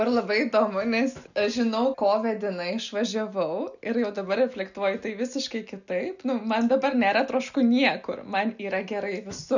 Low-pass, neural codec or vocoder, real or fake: 7.2 kHz; none; real